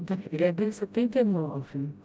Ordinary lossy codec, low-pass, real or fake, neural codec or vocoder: none; none; fake; codec, 16 kHz, 0.5 kbps, FreqCodec, smaller model